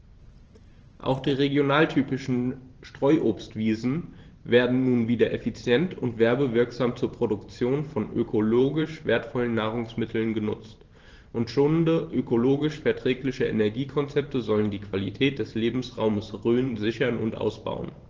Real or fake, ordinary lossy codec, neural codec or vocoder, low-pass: fake; Opus, 16 kbps; vocoder, 44.1 kHz, 128 mel bands every 512 samples, BigVGAN v2; 7.2 kHz